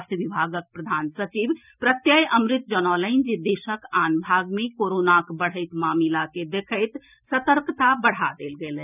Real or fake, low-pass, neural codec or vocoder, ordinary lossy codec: real; 3.6 kHz; none; none